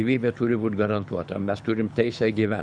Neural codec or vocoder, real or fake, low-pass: codec, 24 kHz, 6 kbps, HILCodec; fake; 9.9 kHz